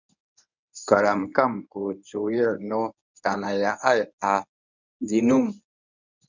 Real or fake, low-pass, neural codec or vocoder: fake; 7.2 kHz; codec, 24 kHz, 0.9 kbps, WavTokenizer, medium speech release version 1